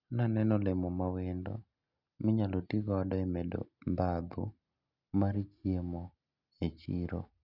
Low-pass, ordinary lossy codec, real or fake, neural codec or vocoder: 5.4 kHz; Opus, 64 kbps; real; none